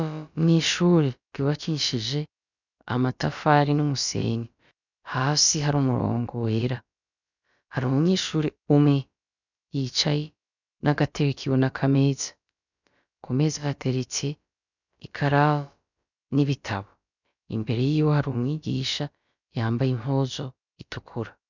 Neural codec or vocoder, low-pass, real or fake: codec, 16 kHz, about 1 kbps, DyCAST, with the encoder's durations; 7.2 kHz; fake